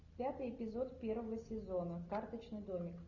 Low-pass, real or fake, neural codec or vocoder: 7.2 kHz; real; none